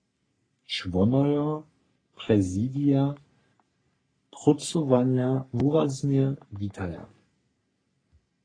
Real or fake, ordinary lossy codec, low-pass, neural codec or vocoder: fake; AAC, 32 kbps; 9.9 kHz; codec, 44.1 kHz, 3.4 kbps, Pupu-Codec